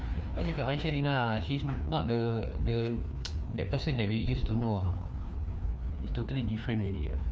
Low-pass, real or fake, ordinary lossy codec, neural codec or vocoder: none; fake; none; codec, 16 kHz, 2 kbps, FreqCodec, larger model